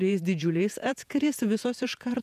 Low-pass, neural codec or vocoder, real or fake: 14.4 kHz; vocoder, 48 kHz, 128 mel bands, Vocos; fake